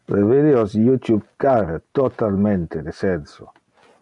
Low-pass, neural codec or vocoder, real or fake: 10.8 kHz; none; real